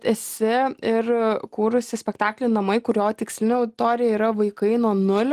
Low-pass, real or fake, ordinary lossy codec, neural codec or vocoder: 14.4 kHz; real; Opus, 24 kbps; none